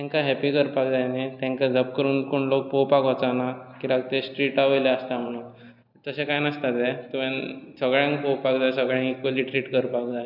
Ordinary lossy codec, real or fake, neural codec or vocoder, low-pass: none; real; none; 5.4 kHz